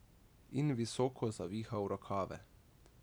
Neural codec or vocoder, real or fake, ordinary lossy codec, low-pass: none; real; none; none